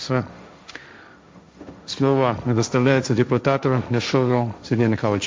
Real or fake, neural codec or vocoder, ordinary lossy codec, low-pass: fake; codec, 16 kHz, 1.1 kbps, Voila-Tokenizer; none; none